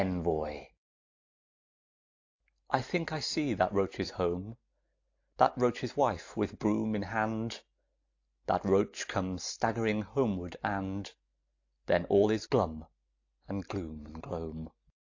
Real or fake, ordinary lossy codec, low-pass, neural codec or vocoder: real; AAC, 48 kbps; 7.2 kHz; none